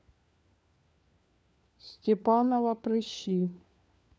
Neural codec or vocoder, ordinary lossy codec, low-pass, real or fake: codec, 16 kHz, 4 kbps, FunCodec, trained on LibriTTS, 50 frames a second; none; none; fake